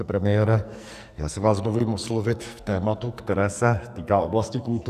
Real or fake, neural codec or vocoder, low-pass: fake; codec, 44.1 kHz, 2.6 kbps, SNAC; 14.4 kHz